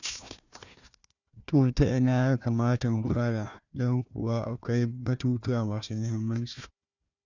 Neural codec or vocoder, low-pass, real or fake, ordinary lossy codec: codec, 16 kHz, 1 kbps, FunCodec, trained on Chinese and English, 50 frames a second; 7.2 kHz; fake; none